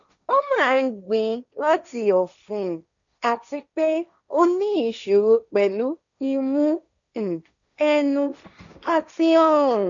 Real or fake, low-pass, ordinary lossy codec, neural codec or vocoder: fake; 7.2 kHz; none; codec, 16 kHz, 1.1 kbps, Voila-Tokenizer